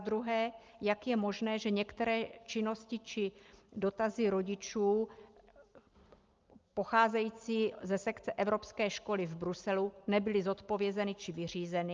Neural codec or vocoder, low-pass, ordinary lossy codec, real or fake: none; 7.2 kHz; Opus, 24 kbps; real